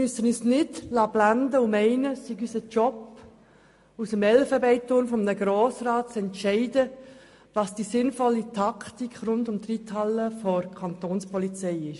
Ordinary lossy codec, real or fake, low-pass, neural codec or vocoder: MP3, 48 kbps; real; 14.4 kHz; none